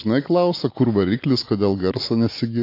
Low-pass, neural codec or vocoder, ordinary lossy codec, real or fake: 5.4 kHz; none; AAC, 32 kbps; real